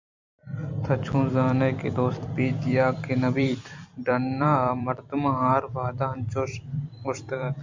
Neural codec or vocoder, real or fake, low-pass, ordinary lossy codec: vocoder, 44.1 kHz, 128 mel bands every 256 samples, BigVGAN v2; fake; 7.2 kHz; MP3, 64 kbps